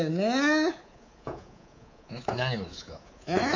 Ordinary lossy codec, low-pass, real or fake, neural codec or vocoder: MP3, 64 kbps; 7.2 kHz; fake; codec, 24 kHz, 3.1 kbps, DualCodec